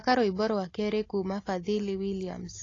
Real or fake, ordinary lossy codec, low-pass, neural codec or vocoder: real; AAC, 32 kbps; 7.2 kHz; none